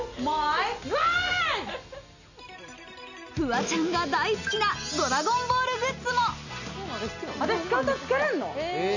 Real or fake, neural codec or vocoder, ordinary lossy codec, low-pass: real; none; none; 7.2 kHz